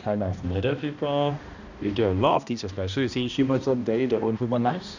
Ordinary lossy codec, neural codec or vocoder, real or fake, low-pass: none; codec, 16 kHz, 1 kbps, X-Codec, HuBERT features, trained on balanced general audio; fake; 7.2 kHz